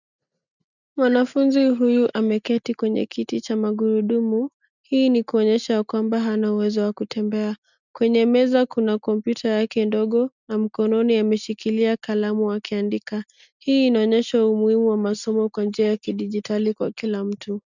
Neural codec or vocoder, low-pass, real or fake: none; 7.2 kHz; real